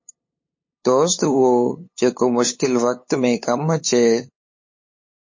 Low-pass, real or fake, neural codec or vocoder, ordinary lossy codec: 7.2 kHz; fake; codec, 16 kHz, 8 kbps, FunCodec, trained on LibriTTS, 25 frames a second; MP3, 32 kbps